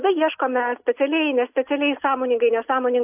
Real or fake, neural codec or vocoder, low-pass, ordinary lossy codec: real; none; 3.6 kHz; AAC, 32 kbps